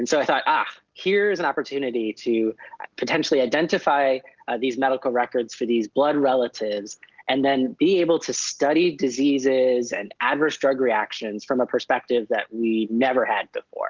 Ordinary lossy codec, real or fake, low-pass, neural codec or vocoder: Opus, 16 kbps; real; 7.2 kHz; none